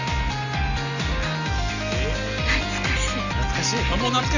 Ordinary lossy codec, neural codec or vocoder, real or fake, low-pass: none; none; real; 7.2 kHz